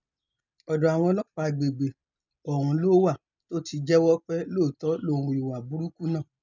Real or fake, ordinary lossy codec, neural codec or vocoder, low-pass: real; none; none; 7.2 kHz